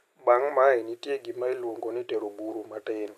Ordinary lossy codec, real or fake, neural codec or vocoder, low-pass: none; real; none; 14.4 kHz